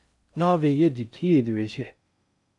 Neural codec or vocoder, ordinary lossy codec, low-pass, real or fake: codec, 16 kHz in and 24 kHz out, 0.6 kbps, FocalCodec, streaming, 4096 codes; MP3, 96 kbps; 10.8 kHz; fake